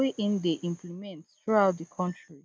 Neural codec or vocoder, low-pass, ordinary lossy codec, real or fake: none; none; none; real